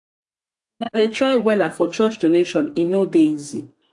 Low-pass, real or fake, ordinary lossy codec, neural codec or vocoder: 10.8 kHz; fake; AAC, 64 kbps; codec, 32 kHz, 1.9 kbps, SNAC